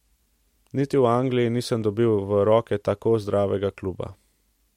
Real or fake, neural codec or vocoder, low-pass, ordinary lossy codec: real; none; 19.8 kHz; MP3, 64 kbps